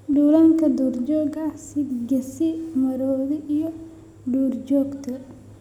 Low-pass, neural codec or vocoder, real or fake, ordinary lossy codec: 19.8 kHz; none; real; none